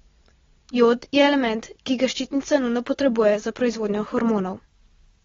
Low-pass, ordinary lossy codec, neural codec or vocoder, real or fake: 7.2 kHz; AAC, 24 kbps; none; real